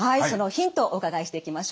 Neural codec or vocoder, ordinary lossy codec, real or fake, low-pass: none; none; real; none